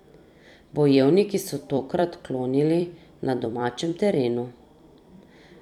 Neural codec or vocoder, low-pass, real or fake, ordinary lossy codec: vocoder, 44.1 kHz, 128 mel bands every 256 samples, BigVGAN v2; 19.8 kHz; fake; none